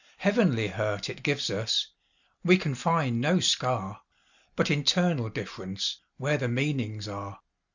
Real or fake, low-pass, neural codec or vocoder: real; 7.2 kHz; none